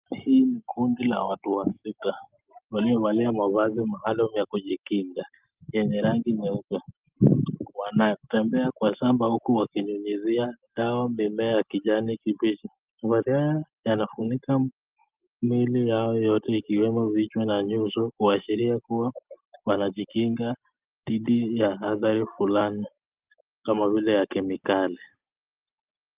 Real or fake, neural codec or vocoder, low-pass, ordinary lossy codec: real; none; 3.6 kHz; Opus, 24 kbps